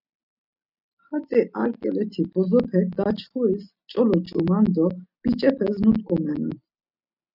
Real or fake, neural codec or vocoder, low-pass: real; none; 5.4 kHz